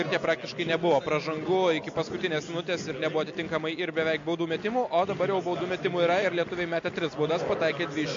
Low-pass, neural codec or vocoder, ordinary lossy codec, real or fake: 7.2 kHz; none; MP3, 48 kbps; real